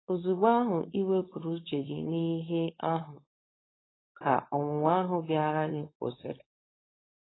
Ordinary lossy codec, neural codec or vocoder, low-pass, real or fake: AAC, 16 kbps; codec, 16 kHz, 4.8 kbps, FACodec; 7.2 kHz; fake